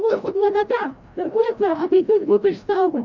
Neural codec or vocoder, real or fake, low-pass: codec, 16 kHz, 0.5 kbps, FreqCodec, larger model; fake; 7.2 kHz